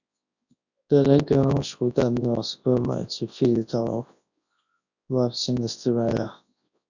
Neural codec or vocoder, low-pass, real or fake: codec, 24 kHz, 0.9 kbps, WavTokenizer, large speech release; 7.2 kHz; fake